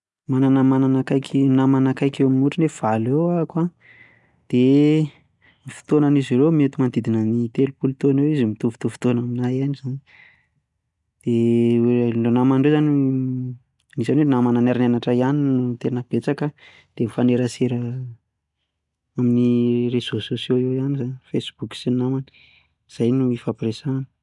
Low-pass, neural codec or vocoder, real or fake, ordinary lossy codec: 10.8 kHz; none; real; none